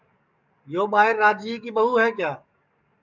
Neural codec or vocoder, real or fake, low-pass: codec, 44.1 kHz, 7.8 kbps, DAC; fake; 7.2 kHz